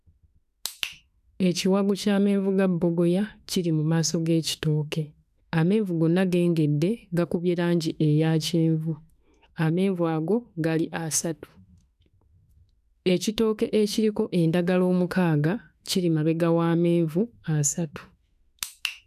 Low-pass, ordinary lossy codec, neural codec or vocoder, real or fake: 14.4 kHz; none; autoencoder, 48 kHz, 32 numbers a frame, DAC-VAE, trained on Japanese speech; fake